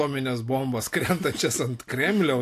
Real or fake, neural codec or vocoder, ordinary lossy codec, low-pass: real; none; AAC, 64 kbps; 14.4 kHz